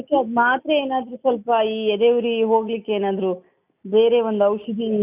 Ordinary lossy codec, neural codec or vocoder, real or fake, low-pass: none; none; real; 3.6 kHz